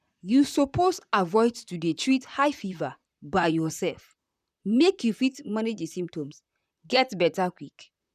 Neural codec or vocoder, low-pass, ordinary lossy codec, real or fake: vocoder, 44.1 kHz, 128 mel bands, Pupu-Vocoder; 14.4 kHz; none; fake